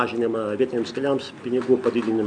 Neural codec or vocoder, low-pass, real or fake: none; 9.9 kHz; real